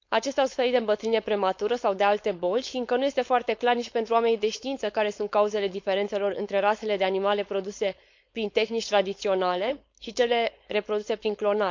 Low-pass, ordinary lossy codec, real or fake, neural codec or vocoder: 7.2 kHz; MP3, 64 kbps; fake; codec, 16 kHz, 4.8 kbps, FACodec